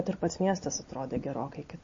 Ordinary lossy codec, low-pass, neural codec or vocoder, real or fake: MP3, 32 kbps; 7.2 kHz; none; real